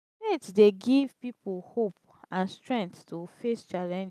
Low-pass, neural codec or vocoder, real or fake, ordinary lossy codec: 14.4 kHz; none; real; none